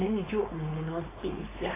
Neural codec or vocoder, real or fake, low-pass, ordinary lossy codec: codec, 16 kHz, 4.8 kbps, FACodec; fake; 3.6 kHz; AAC, 16 kbps